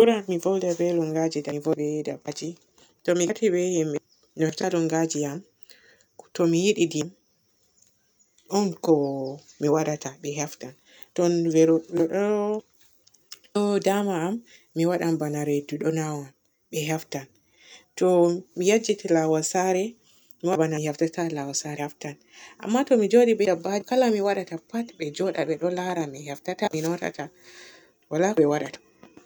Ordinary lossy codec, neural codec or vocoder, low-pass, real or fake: none; none; none; real